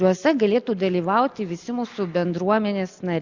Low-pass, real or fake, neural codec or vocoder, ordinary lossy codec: 7.2 kHz; real; none; Opus, 64 kbps